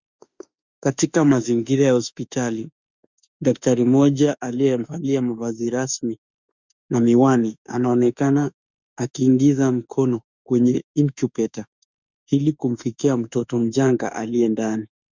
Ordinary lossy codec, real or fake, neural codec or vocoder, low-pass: Opus, 64 kbps; fake; autoencoder, 48 kHz, 32 numbers a frame, DAC-VAE, trained on Japanese speech; 7.2 kHz